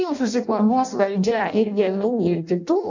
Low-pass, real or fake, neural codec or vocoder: 7.2 kHz; fake; codec, 16 kHz in and 24 kHz out, 0.6 kbps, FireRedTTS-2 codec